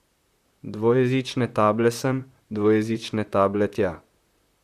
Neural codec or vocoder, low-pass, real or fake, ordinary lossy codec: vocoder, 44.1 kHz, 128 mel bands, Pupu-Vocoder; 14.4 kHz; fake; Opus, 64 kbps